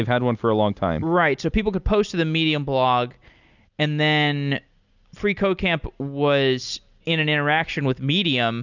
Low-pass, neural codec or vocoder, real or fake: 7.2 kHz; none; real